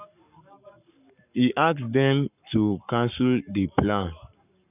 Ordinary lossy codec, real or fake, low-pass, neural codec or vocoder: none; fake; 3.6 kHz; codec, 44.1 kHz, 7.8 kbps, Pupu-Codec